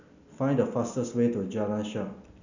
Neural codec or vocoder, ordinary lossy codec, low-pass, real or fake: none; none; 7.2 kHz; real